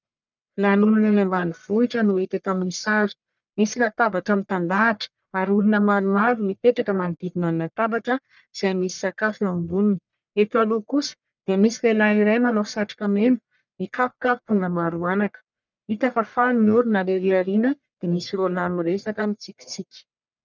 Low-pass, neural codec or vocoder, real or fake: 7.2 kHz; codec, 44.1 kHz, 1.7 kbps, Pupu-Codec; fake